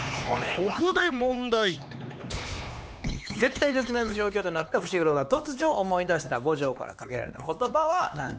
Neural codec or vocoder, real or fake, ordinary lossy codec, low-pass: codec, 16 kHz, 2 kbps, X-Codec, HuBERT features, trained on LibriSpeech; fake; none; none